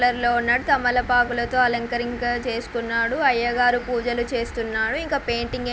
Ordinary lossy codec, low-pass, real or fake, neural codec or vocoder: none; none; real; none